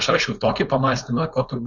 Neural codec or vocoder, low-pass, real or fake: codec, 16 kHz, 4.8 kbps, FACodec; 7.2 kHz; fake